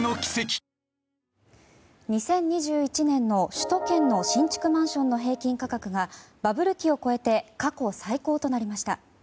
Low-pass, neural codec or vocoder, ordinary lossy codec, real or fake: none; none; none; real